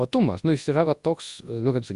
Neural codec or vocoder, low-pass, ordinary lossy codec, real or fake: codec, 24 kHz, 0.9 kbps, WavTokenizer, large speech release; 10.8 kHz; Opus, 64 kbps; fake